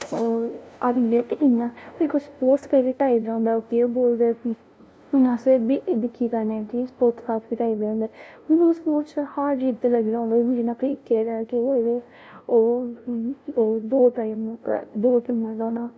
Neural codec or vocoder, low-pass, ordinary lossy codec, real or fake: codec, 16 kHz, 0.5 kbps, FunCodec, trained on LibriTTS, 25 frames a second; none; none; fake